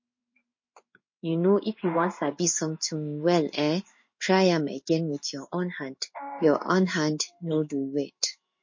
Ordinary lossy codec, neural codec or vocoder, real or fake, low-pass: MP3, 32 kbps; codec, 16 kHz in and 24 kHz out, 1 kbps, XY-Tokenizer; fake; 7.2 kHz